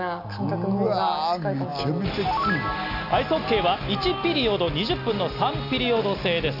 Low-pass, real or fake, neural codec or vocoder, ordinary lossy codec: 5.4 kHz; real; none; none